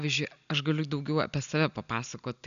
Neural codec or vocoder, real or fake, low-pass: none; real; 7.2 kHz